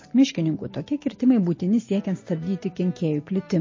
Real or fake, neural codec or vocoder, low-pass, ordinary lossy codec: real; none; 7.2 kHz; MP3, 32 kbps